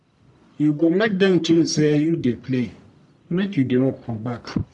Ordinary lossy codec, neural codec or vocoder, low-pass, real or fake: none; codec, 44.1 kHz, 1.7 kbps, Pupu-Codec; 10.8 kHz; fake